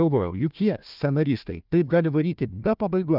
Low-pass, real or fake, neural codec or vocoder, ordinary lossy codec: 5.4 kHz; fake; codec, 16 kHz, 1 kbps, FunCodec, trained on Chinese and English, 50 frames a second; Opus, 24 kbps